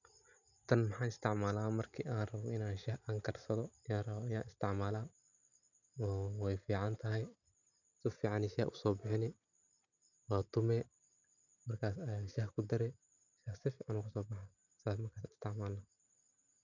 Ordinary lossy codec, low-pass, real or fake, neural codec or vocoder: none; 7.2 kHz; real; none